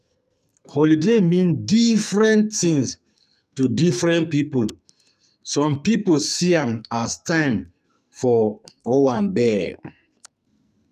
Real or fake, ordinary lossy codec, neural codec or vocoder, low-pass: fake; none; codec, 44.1 kHz, 2.6 kbps, SNAC; 14.4 kHz